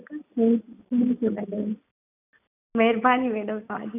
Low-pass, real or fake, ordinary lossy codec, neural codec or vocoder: 3.6 kHz; real; none; none